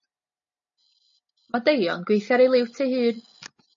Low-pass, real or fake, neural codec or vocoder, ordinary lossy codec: 7.2 kHz; real; none; MP3, 32 kbps